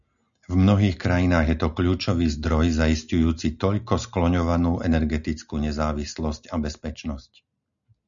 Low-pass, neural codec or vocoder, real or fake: 7.2 kHz; none; real